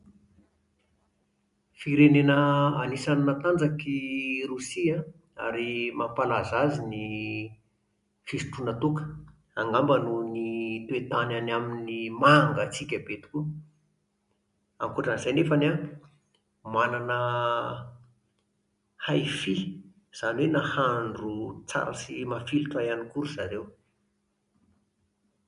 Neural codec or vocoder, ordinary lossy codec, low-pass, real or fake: none; MP3, 64 kbps; 10.8 kHz; real